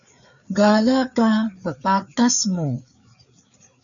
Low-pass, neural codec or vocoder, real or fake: 7.2 kHz; codec, 16 kHz, 4 kbps, FreqCodec, larger model; fake